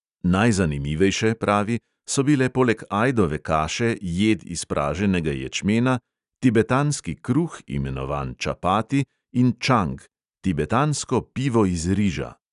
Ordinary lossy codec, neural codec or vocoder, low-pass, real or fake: none; none; 10.8 kHz; real